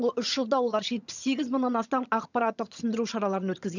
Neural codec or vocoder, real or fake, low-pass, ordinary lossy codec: vocoder, 22.05 kHz, 80 mel bands, HiFi-GAN; fake; 7.2 kHz; none